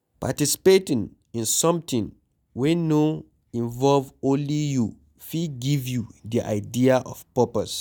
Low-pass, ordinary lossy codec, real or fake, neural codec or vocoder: none; none; real; none